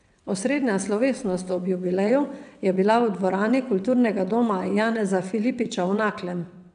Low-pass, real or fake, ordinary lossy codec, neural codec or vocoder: 9.9 kHz; fake; none; vocoder, 22.05 kHz, 80 mel bands, WaveNeXt